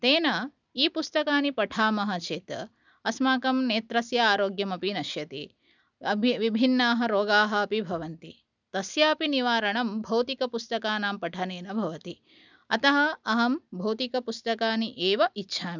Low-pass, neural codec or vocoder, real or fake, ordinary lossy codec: 7.2 kHz; none; real; none